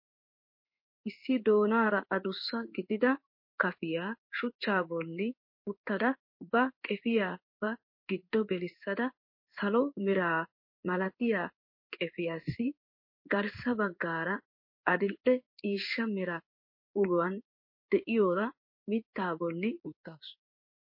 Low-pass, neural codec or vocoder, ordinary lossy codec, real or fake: 5.4 kHz; codec, 16 kHz in and 24 kHz out, 1 kbps, XY-Tokenizer; MP3, 32 kbps; fake